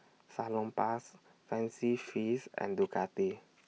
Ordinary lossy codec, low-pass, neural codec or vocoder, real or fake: none; none; none; real